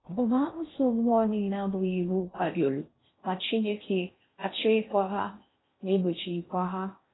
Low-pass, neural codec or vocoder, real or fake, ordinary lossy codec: 7.2 kHz; codec, 16 kHz in and 24 kHz out, 0.6 kbps, FocalCodec, streaming, 4096 codes; fake; AAC, 16 kbps